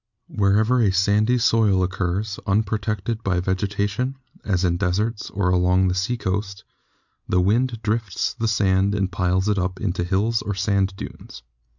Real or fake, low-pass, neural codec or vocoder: real; 7.2 kHz; none